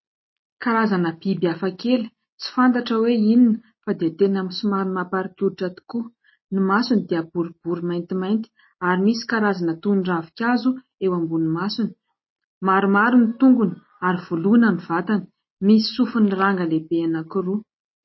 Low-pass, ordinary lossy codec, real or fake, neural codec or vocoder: 7.2 kHz; MP3, 24 kbps; real; none